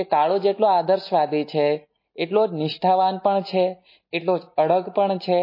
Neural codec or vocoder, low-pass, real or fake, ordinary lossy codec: none; 5.4 kHz; real; MP3, 24 kbps